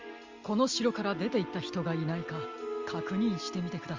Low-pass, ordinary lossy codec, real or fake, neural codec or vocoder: 7.2 kHz; Opus, 32 kbps; real; none